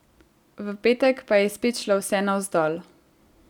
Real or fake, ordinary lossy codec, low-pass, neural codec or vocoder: real; none; 19.8 kHz; none